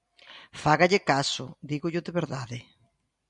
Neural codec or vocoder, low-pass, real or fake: none; 10.8 kHz; real